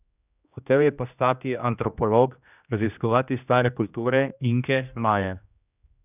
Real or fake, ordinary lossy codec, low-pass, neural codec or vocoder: fake; none; 3.6 kHz; codec, 16 kHz, 1 kbps, X-Codec, HuBERT features, trained on general audio